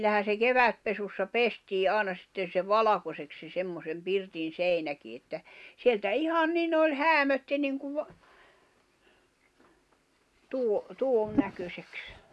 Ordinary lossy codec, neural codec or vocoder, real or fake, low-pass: none; none; real; none